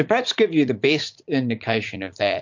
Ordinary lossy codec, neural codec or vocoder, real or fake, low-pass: MP3, 48 kbps; vocoder, 44.1 kHz, 80 mel bands, Vocos; fake; 7.2 kHz